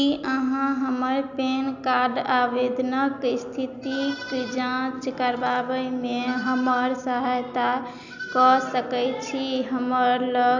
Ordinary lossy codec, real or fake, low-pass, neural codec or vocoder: none; real; 7.2 kHz; none